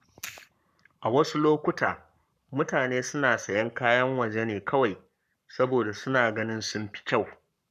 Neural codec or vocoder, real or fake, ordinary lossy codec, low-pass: codec, 44.1 kHz, 7.8 kbps, Pupu-Codec; fake; none; 14.4 kHz